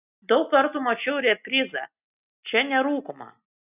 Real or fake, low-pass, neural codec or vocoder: real; 3.6 kHz; none